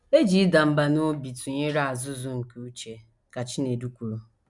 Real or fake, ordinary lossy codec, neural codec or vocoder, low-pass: real; none; none; 10.8 kHz